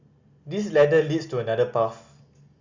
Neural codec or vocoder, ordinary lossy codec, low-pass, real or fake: none; Opus, 64 kbps; 7.2 kHz; real